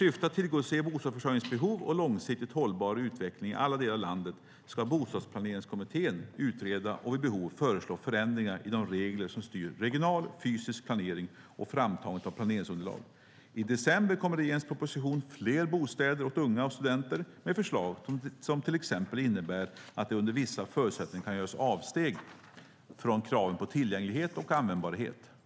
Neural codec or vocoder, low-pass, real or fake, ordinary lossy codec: none; none; real; none